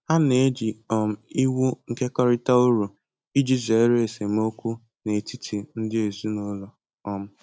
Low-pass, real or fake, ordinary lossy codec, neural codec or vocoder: none; real; none; none